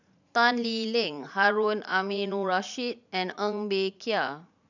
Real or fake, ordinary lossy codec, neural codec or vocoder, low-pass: fake; none; vocoder, 44.1 kHz, 80 mel bands, Vocos; 7.2 kHz